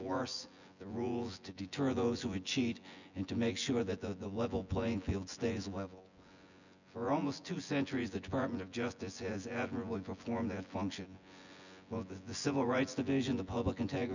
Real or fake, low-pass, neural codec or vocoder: fake; 7.2 kHz; vocoder, 24 kHz, 100 mel bands, Vocos